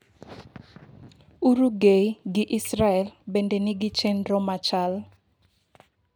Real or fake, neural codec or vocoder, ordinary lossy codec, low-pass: real; none; none; none